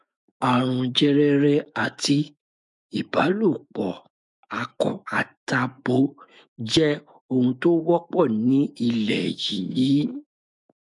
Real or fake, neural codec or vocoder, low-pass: fake; autoencoder, 48 kHz, 128 numbers a frame, DAC-VAE, trained on Japanese speech; 10.8 kHz